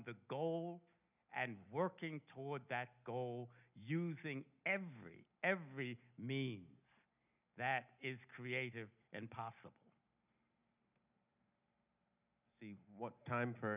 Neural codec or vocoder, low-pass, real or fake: none; 3.6 kHz; real